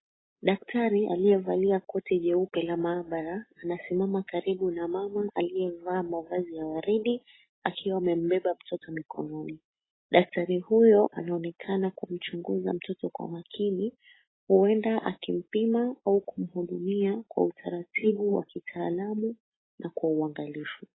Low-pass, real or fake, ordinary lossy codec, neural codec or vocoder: 7.2 kHz; real; AAC, 16 kbps; none